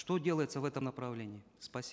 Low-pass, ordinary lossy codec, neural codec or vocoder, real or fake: none; none; none; real